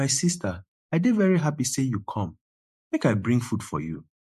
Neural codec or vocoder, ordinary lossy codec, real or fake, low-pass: vocoder, 48 kHz, 128 mel bands, Vocos; MP3, 64 kbps; fake; 14.4 kHz